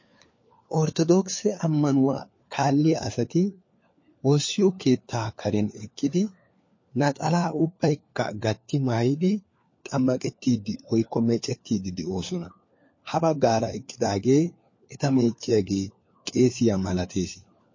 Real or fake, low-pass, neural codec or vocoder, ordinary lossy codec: fake; 7.2 kHz; codec, 16 kHz, 4 kbps, FunCodec, trained on LibriTTS, 50 frames a second; MP3, 32 kbps